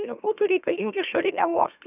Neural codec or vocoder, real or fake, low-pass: autoencoder, 44.1 kHz, a latent of 192 numbers a frame, MeloTTS; fake; 3.6 kHz